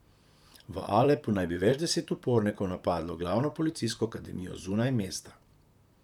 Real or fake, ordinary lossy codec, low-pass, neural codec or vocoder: fake; none; 19.8 kHz; vocoder, 44.1 kHz, 128 mel bands, Pupu-Vocoder